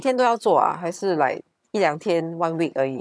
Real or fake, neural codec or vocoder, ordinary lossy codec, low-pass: fake; vocoder, 22.05 kHz, 80 mel bands, HiFi-GAN; none; none